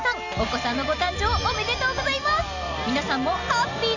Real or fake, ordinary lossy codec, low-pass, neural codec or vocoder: real; none; 7.2 kHz; none